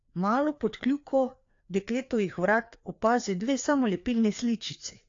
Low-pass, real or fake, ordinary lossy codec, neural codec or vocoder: 7.2 kHz; fake; AAC, 48 kbps; codec, 16 kHz, 2 kbps, FreqCodec, larger model